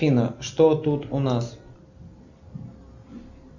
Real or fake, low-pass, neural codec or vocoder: real; 7.2 kHz; none